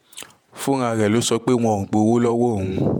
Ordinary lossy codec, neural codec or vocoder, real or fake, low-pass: MP3, 96 kbps; vocoder, 48 kHz, 128 mel bands, Vocos; fake; 19.8 kHz